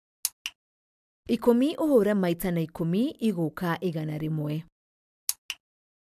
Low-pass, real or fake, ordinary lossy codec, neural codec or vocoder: 14.4 kHz; real; AAC, 96 kbps; none